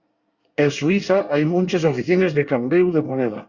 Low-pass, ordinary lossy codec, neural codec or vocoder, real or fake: 7.2 kHz; Opus, 64 kbps; codec, 24 kHz, 1 kbps, SNAC; fake